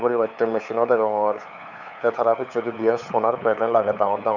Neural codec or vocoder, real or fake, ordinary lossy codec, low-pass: codec, 16 kHz, 8 kbps, FunCodec, trained on LibriTTS, 25 frames a second; fake; none; 7.2 kHz